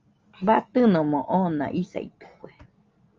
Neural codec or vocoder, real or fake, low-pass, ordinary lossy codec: none; real; 7.2 kHz; Opus, 32 kbps